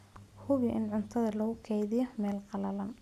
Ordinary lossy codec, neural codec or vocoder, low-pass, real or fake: none; none; 14.4 kHz; real